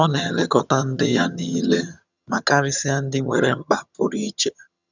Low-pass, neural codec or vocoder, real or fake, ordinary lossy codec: 7.2 kHz; vocoder, 22.05 kHz, 80 mel bands, HiFi-GAN; fake; none